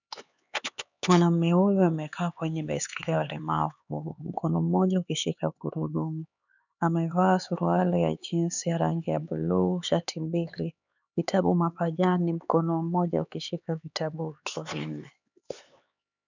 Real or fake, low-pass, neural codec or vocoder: fake; 7.2 kHz; codec, 16 kHz, 4 kbps, X-Codec, HuBERT features, trained on LibriSpeech